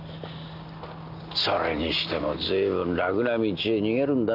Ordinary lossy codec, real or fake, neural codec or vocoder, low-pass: none; real; none; 5.4 kHz